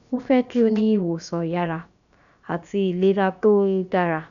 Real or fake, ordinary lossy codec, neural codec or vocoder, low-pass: fake; none; codec, 16 kHz, about 1 kbps, DyCAST, with the encoder's durations; 7.2 kHz